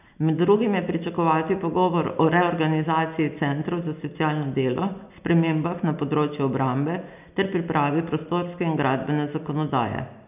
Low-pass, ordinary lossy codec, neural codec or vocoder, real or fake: 3.6 kHz; none; none; real